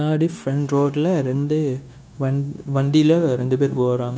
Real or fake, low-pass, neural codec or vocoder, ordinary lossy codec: fake; none; codec, 16 kHz, 0.9 kbps, LongCat-Audio-Codec; none